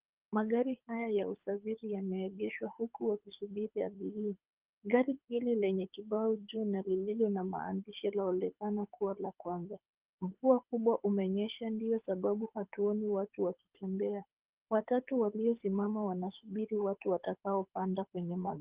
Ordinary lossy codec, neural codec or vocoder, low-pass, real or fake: Opus, 24 kbps; codec, 24 kHz, 6 kbps, HILCodec; 3.6 kHz; fake